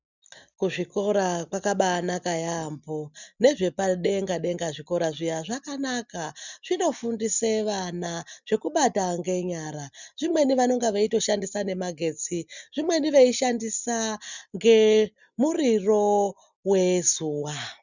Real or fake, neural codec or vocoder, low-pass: real; none; 7.2 kHz